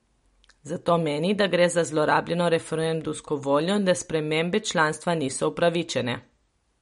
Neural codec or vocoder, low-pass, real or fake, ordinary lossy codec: none; 10.8 kHz; real; MP3, 48 kbps